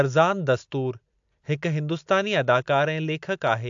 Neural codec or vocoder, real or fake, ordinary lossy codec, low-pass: none; real; AAC, 64 kbps; 7.2 kHz